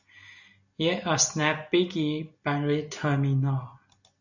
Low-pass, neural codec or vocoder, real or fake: 7.2 kHz; none; real